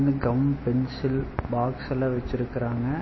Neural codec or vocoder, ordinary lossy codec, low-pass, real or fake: none; MP3, 24 kbps; 7.2 kHz; real